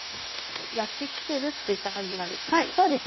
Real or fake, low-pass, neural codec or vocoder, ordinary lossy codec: fake; 7.2 kHz; codec, 16 kHz, 0.9 kbps, LongCat-Audio-Codec; MP3, 24 kbps